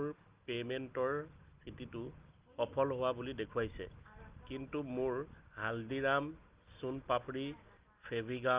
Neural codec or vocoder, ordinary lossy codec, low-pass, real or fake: none; Opus, 24 kbps; 3.6 kHz; real